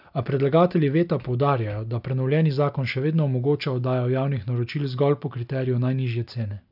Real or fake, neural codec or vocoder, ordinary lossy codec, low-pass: real; none; none; 5.4 kHz